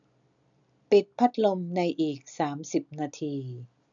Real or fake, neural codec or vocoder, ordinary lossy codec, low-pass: real; none; none; 7.2 kHz